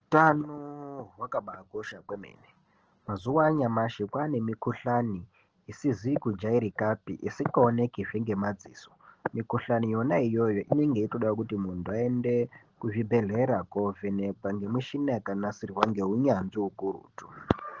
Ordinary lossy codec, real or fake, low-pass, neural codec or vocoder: Opus, 16 kbps; real; 7.2 kHz; none